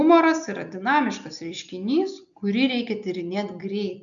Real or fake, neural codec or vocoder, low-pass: real; none; 7.2 kHz